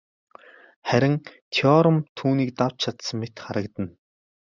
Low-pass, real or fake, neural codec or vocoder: 7.2 kHz; real; none